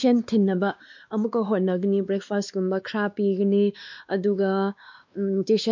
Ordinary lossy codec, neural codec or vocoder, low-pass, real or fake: MP3, 64 kbps; codec, 16 kHz, 2 kbps, X-Codec, WavLM features, trained on Multilingual LibriSpeech; 7.2 kHz; fake